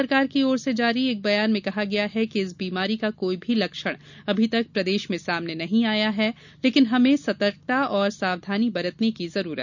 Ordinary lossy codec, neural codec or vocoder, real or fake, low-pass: none; none; real; 7.2 kHz